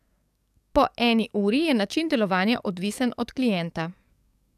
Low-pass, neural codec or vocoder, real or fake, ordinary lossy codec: 14.4 kHz; codec, 44.1 kHz, 7.8 kbps, DAC; fake; none